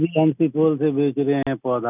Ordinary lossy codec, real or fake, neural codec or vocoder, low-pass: none; real; none; 3.6 kHz